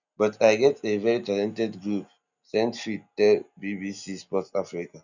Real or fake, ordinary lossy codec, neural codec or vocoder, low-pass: real; none; none; 7.2 kHz